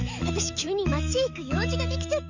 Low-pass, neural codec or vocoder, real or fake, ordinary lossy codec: 7.2 kHz; autoencoder, 48 kHz, 128 numbers a frame, DAC-VAE, trained on Japanese speech; fake; none